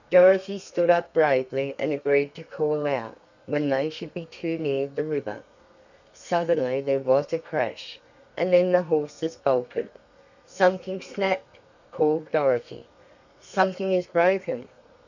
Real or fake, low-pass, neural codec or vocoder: fake; 7.2 kHz; codec, 32 kHz, 1.9 kbps, SNAC